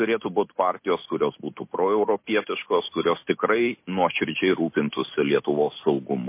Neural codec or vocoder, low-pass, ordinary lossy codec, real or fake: none; 3.6 kHz; MP3, 24 kbps; real